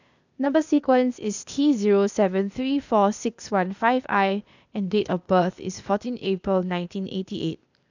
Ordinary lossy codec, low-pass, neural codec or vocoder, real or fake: none; 7.2 kHz; codec, 16 kHz, 0.8 kbps, ZipCodec; fake